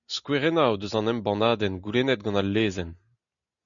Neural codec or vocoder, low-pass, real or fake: none; 7.2 kHz; real